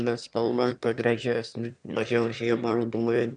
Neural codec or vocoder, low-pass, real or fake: autoencoder, 22.05 kHz, a latent of 192 numbers a frame, VITS, trained on one speaker; 9.9 kHz; fake